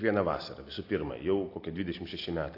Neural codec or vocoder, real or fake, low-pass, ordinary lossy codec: none; real; 5.4 kHz; AAC, 32 kbps